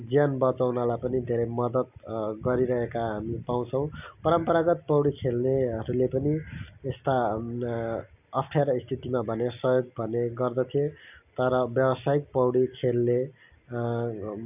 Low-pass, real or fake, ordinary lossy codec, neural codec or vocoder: 3.6 kHz; real; none; none